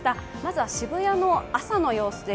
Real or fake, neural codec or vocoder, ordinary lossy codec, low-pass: real; none; none; none